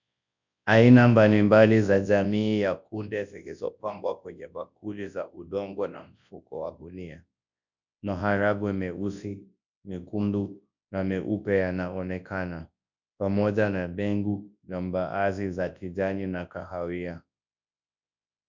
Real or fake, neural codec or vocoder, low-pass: fake; codec, 24 kHz, 0.9 kbps, WavTokenizer, large speech release; 7.2 kHz